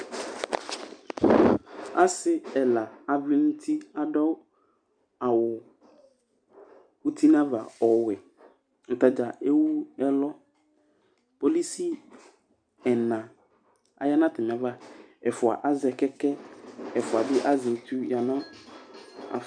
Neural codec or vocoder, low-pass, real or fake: none; 9.9 kHz; real